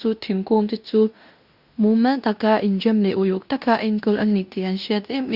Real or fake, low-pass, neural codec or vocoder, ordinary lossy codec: fake; 5.4 kHz; codec, 16 kHz in and 24 kHz out, 0.9 kbps, LongCat-Audio-Codec, fine tuned four codebook decoder; Opus, 64 kbps